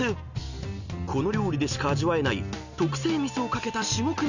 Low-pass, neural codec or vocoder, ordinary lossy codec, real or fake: 7.2 kHz; none; none; real